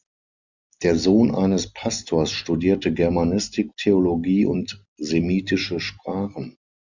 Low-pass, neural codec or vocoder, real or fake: 7.2 kHz; none; real